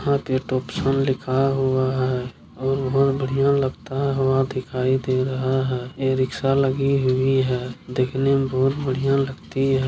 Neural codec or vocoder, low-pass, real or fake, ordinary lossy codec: none; none; real; none